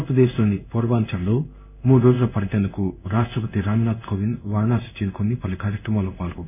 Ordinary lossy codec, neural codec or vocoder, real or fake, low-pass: none; codec, 16 kHz in and 24 kHz out, 1 kbps, XY-Tokenizer; fake; 3.6 kHz